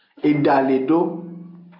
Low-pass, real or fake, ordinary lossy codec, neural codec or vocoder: 5.4 kHz; real; AAC, 48 kbps; none